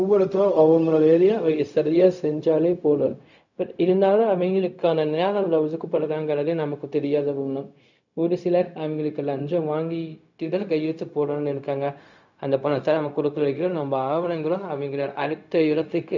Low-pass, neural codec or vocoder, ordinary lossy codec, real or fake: 7.2 kHz; codec, 16 kHz, 0.4 kbps, LongCat-Audio-Codec; none; fake